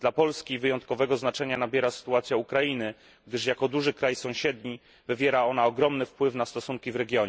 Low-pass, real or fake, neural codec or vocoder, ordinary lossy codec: none; real; none; none